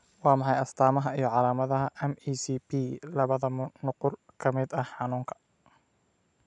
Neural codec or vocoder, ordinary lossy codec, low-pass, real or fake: none; none; 10.8 kHz; real